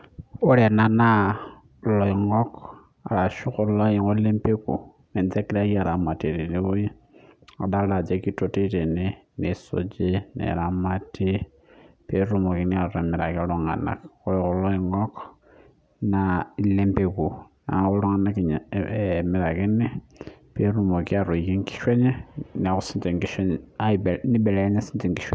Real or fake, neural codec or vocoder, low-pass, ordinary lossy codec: real; none; none; none